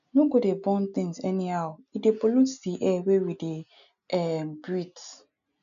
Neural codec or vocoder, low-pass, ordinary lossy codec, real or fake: none; 7.2 kHz; none; real